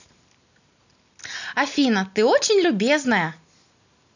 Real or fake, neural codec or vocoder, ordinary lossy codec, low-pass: real; none; none; 7.2 kHz